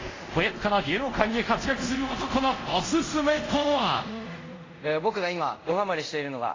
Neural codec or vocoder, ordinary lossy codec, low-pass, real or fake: codec, 24 kHz, 0.5 kbps, DualCodec; AAC, 32 kbps; 7.2 kHz; fake